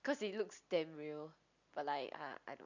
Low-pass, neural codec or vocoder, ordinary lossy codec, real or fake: 7.2 kHz; none; none; real